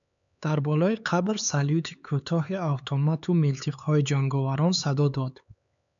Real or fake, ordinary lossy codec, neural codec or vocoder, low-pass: fake; MP3, 96 kbps; codec, 16 kHz, 4 kbps, X-Codec, HuBERT features, trained on LibriSpeech; 7.2 kHz